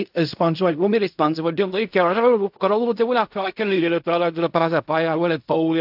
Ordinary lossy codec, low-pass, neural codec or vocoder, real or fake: AAC, 48 kbps; 5.4 kHz; codec, 16 kHz in and 24 kHz out, 0.4 kbps, LongCat-Audio-Codec, fine tuned four codebook decoder; fake